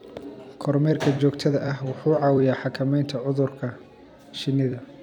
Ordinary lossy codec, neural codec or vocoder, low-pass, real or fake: none; vocoder, 44.1 kHz, 128 mel bands every 256 samples, BigVGAN v2; 19.8 kHz; fake